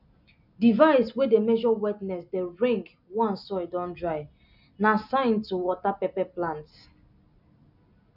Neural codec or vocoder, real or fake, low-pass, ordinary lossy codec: none; real; 5.4 kHz; none